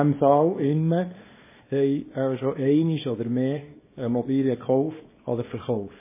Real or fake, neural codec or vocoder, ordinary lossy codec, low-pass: fake; codec, 24 kHz, 0.9 kbps, WavTokenizer, small release; MP3, 16 kbps; 3.6 kHz